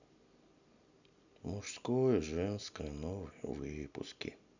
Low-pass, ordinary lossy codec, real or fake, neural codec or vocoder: 7.2 kHz; none; real; none